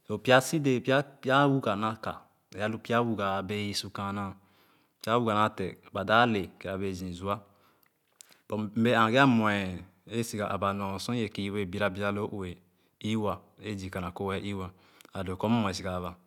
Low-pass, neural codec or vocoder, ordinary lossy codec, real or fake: 19.8 kHz; none; none; real